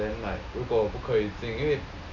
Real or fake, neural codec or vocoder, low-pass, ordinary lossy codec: real; none; 7.2 kHz; none